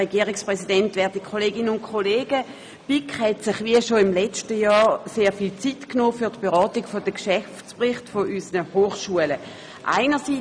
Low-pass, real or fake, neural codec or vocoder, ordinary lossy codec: 9.9 kHz; real; none; none